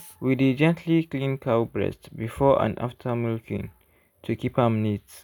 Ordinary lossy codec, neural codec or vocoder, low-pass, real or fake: none; none; none; real